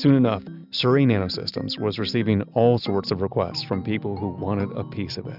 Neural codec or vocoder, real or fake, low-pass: none; real; 5.4 kHz